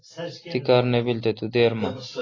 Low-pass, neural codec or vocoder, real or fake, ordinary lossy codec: 7.2 kHz; none; real; AAC, 32 kbps